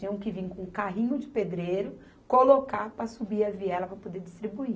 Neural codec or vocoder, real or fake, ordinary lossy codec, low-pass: none; real; none; none